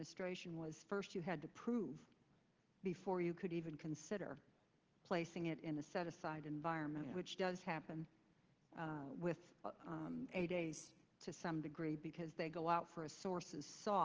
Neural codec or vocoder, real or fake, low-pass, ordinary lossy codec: none; real; 7.2 kHz; Opus, 16 kbps